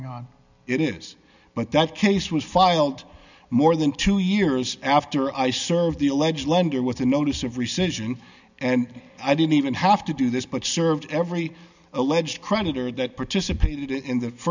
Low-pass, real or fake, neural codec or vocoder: 7.2 kHz; real; none